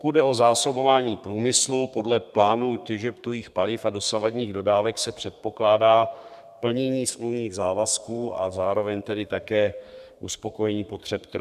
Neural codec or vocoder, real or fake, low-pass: codec, 32 kHz, 1.9 kbps, SNAC; fake; 14.4 kHz